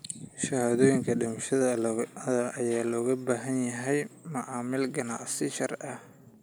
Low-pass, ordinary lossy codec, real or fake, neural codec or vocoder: none; none; real; none